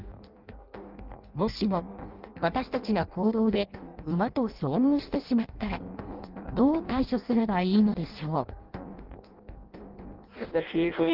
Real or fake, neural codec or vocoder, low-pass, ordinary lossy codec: fake; codec, 16 kHz in and 24 kHz out, 0.6 kbps, FireRedTTS-2 codec; 5.4 kHz; Opus, 32 kbps